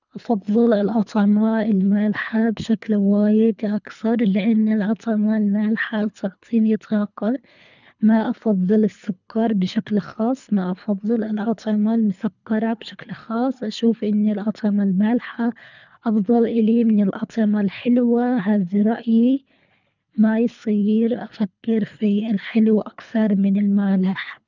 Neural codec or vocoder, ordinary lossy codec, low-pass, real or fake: codec, 24 kHz, 3 kbps, HILCodec; none; 7.2 kHz; fake